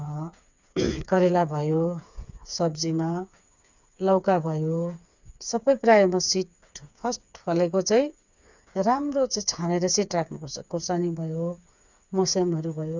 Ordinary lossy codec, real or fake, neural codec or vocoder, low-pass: none; fake; codec, 16 kHz, 4 kbps, FreqCodec, smaller model; 7.2 kHz